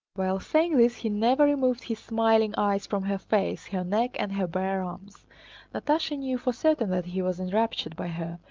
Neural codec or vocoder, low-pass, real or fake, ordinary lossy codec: none; 7.2 kHz; real; Opus, 32 kbps